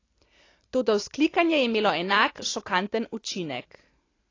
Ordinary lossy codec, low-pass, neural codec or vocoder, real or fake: AAC, 32 kbps; 7.2 kHz; none; real